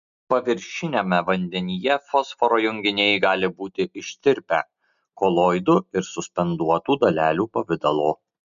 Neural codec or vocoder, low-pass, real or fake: none; 7.2 kHz; real